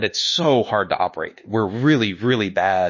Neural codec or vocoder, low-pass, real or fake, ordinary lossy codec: codec, 24 kHz, 1.2 kbps, DualCodec; 7.2 kHz; fake; MP3, 32 kbps